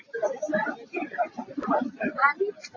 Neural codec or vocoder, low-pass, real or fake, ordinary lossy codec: none; 7.2 kHz; real; AAC, 32 kbps